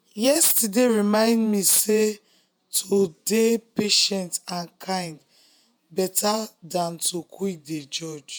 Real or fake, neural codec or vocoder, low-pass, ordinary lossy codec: fake; vocoder, 48 kHz, 128 mel bands, Vocos; none; none